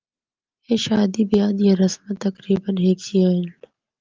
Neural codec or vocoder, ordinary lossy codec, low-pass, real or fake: none; Opus, 32 kbps; 7.2 kHz; real